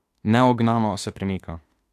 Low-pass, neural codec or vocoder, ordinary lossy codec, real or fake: 14.4 kHz; autoencoder, 48 kHz, 32 numbers a frame, DAC-VAE, trained on Japanese speech; AAC, 64 kbps; fake